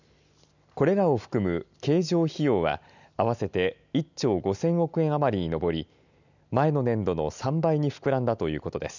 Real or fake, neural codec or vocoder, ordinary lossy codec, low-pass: real; none; none; 7.2 kHz